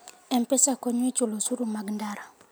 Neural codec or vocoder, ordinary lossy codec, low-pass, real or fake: none; none; none; real